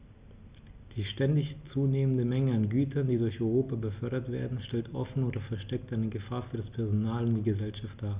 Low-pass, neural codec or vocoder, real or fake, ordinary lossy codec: 3.6 kHz; none; real; Opus, 64 kbps